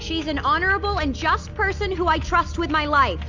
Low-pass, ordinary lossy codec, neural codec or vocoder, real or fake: 7.2 kHz; AAC, 48 kbps; none; real